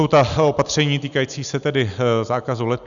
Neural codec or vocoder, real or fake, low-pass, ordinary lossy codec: none; real; 7.2 kHz; MP3, 96 kbps